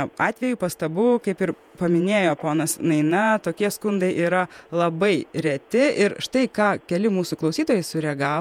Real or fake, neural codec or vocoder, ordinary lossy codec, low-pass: fake; vocoder, 48 kHz, 128 mel bands, Vocos; MP3, 96 kbps; 19.8 kHz